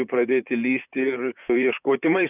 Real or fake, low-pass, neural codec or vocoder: fake; 3.6 kHz; vocoder, 44.1 kHz, 128 mel bands, Pupu-Vocoder